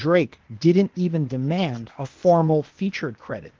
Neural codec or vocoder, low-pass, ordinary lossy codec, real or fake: codec, 16 kHz, 0.8 kbps, ZipCodec; 7.2 kHz; Opus, 16 kbps; fake